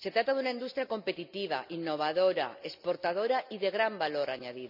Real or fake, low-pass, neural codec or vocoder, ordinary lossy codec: real; 5.4 kHz; none; none